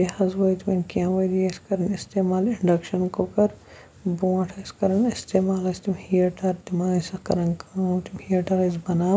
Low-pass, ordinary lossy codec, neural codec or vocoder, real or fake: none; none; none; real